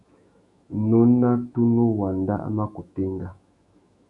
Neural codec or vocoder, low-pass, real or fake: autoencoder, 48 kHz, 128 numbers a frame, DAC-VAE, trained on Japanese speech; 10.8 kHz; fake